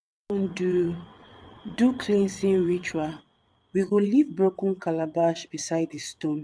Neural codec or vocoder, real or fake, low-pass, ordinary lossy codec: vocoder, 22.05 kHz, 80 mel bands, WaveNeXt; fake; none; none